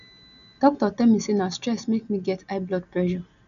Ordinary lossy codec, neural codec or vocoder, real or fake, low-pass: none; none; real; 7.2 kHz